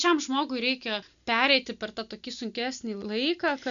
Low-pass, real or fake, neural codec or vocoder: 7.2 kHz; real; none